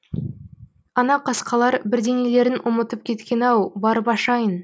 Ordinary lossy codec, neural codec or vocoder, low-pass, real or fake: none; none; none; real